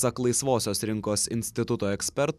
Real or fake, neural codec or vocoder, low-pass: real; none; 14.4 kHz